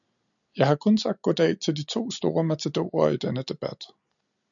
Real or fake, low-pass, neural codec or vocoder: real; 7.2 kHz; none